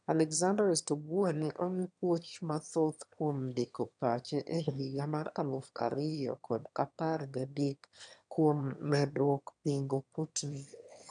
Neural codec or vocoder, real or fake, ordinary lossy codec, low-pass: autoencoder, 22.05 kHz, a latent of 192 numbers a frame, VITS, trained on one speaker; fake; none; 9.9 kHz